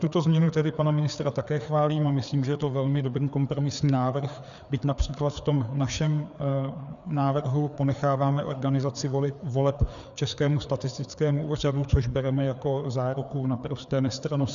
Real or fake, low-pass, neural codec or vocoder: fake; 7.2 kHz; codec, 16 kHz, 4 kbps, FreqCodec, larger model